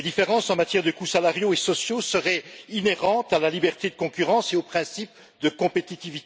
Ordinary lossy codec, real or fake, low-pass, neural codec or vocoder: none; real; none; none